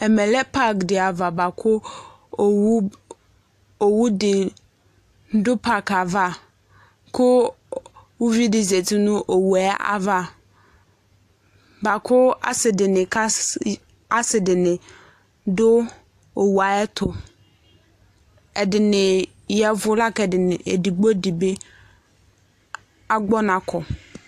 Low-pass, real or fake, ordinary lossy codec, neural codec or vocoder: 14.4 kHz; real; AAC, 64 kbps; none